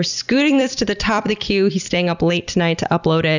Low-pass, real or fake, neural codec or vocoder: 7.2 kHz; fake; vocoder, 44.1 kHz, 128 mel bands every 256 samples, BigVGAN v2